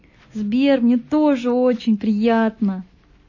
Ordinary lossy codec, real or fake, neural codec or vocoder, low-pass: MP3, 32 kbps; real; none; 7.2 kHz